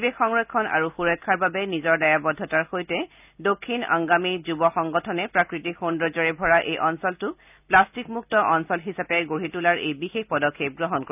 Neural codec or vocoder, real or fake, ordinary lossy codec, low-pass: none; real; none; 3.6 kHz